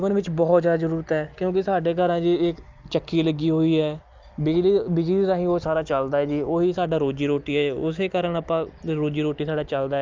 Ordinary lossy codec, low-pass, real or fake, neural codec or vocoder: Opus, 24 kbps; 7.2 kHz; real; none